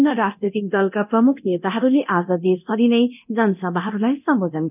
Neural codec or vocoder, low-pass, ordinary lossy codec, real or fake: codec, 24 kHz, 0.9 kbps, DualCodec; 3.6 kHz; none; fake